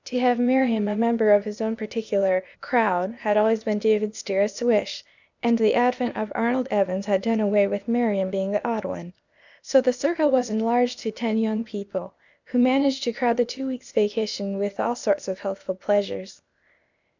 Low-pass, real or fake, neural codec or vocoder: 7.2 kHz; fake; codec, 16 kHz, 0.8 kbps, ZipCodec